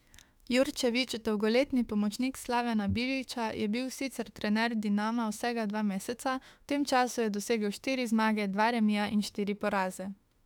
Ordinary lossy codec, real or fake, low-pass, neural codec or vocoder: none; fake; 19.8 kHz; autoencoder, 48 kHz, 32 numbers a frame, DAC-VAE, trained on Japanese speech